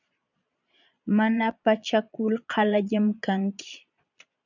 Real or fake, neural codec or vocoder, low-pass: fake; vocoder, 22.05 kHz, 80 mel bands, Vocos; 7.2 kHz